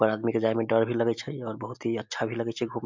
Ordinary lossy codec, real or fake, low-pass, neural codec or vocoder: MP3, 64 kbps; real; 7.2 kHz; none